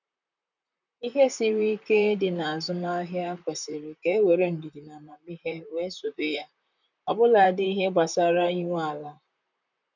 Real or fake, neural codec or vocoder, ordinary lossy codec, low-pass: fake; vocoder, 44.1 kHz, 128 mel bands, Pupu-Vocoder; none; 7.2 kHz